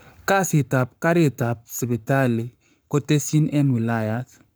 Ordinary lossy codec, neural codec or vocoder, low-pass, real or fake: none; codec, 44.1 kHz, 7.8 kbps, Pupu-Codec; none; fake